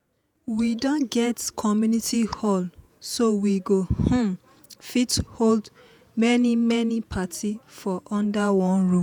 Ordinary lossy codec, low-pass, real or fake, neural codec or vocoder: none; none; fake; vocoder, 48 kHz, 128 mel bands, Vocos